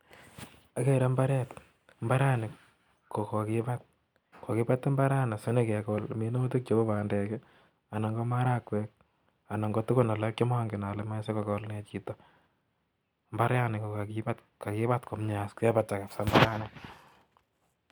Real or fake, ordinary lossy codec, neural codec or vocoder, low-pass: real; none; none; 19.8 kHz